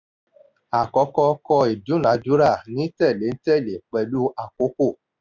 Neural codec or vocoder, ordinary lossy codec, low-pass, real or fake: none; none; 7.2 kHz; real